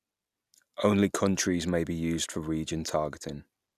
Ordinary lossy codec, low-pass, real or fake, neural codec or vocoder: none; 14.4 kHz; real; none